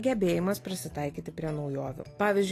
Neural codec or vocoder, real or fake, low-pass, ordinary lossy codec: none; real; 14.4 kHz; AAC, 48 kbps